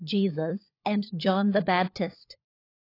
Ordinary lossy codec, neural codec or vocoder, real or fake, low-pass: AAC, 32 kbps; codec, 16 kHz, 16 kbps, FunCodec, trained on LibriTTS, 50 frames a second; fake; 5.4 kHz